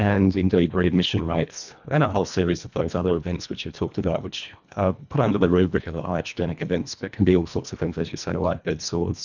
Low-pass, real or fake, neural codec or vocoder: 7.2 kHz; fake; codec, 24 kHz, 1.5 kbps, HILCodec